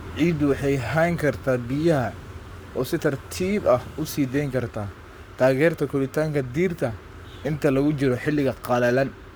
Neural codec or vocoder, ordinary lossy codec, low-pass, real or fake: codec, 44.1 kHz, 7.8 kbps, Pupu-Codec; none; none; fake